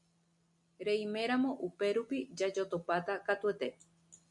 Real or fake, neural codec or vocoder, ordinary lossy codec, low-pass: real; none; MP3, 96 kbps; 10.8 kHz